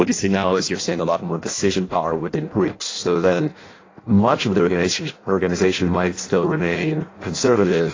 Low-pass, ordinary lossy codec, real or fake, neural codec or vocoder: 7.2 kHz; AAC, 32 kbps; fake; codec, 16 kHz in and 24 kHz out, 0.6 kbps, FireRedTTS-2 codec